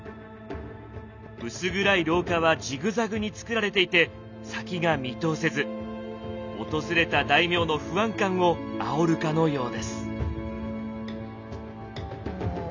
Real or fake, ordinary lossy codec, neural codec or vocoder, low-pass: real; none; none; 7.2 kHz